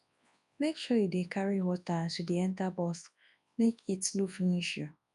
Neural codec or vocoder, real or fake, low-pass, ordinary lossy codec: codec, 24 kHz, 0.9 kbps, WavTokenizer, large speech release; fake; 10.8 kHz; none